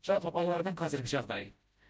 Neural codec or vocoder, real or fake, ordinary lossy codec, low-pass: codec, 16 kHz, 0.5 kbps, FreqCodec, smaller model; fake; none; none